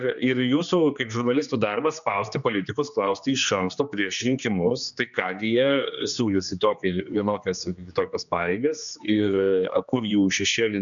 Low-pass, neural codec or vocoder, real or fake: 7.2 kHz; codec, 16 kHz, 2 kbps, X-Codec, HuBERT features, trained on general audio; fake